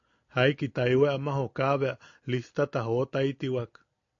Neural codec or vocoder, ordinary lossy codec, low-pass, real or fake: none; AAC, 48 kbps; 7.2 kHz; real